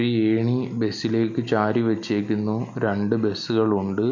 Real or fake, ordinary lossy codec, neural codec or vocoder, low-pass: real; none; none; 7.2 kHz